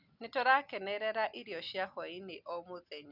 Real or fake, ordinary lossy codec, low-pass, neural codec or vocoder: real; none; 5.4 kHz; none